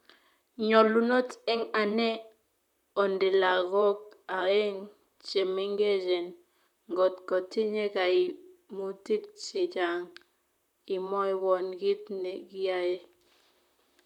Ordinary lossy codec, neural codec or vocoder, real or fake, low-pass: none; vocoder, 44.1 kHz, 128 mel bands, Pupu-Vocoder; fake; 19.8 kHz